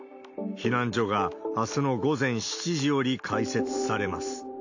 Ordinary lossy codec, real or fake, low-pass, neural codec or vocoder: AAC, 48 kbps; real; 7.2 kHz; none